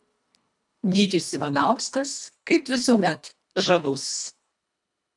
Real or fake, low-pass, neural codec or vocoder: fake; 10.8 kHz; codec, 24 kHz, 1.5 kbps, HILCodec